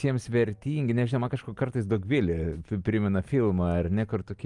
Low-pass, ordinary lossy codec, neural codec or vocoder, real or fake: 10.8 kHz; Opus, 32 kbps; none; real